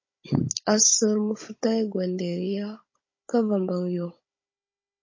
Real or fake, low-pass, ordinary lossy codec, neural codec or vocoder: fake; 7.2 kHz; MP3, 32 kbps; codec, 16 kHz, 16 kbps, FunCodec, trained on Chinese and English, 50 frames a second